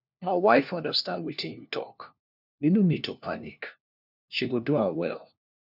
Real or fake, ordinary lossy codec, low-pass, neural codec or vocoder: fake; none; 5.4 kHz; codec, 16 kHz, 1 kbps, FunCodec, trained on LibriTTS, 50 frames a second